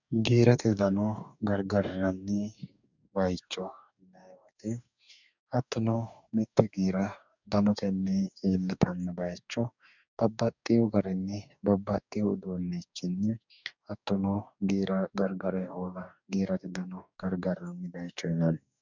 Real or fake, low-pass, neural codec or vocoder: fake; 7.2 kHz; codec, 44.1 kHz, 2.6 kbps, DAC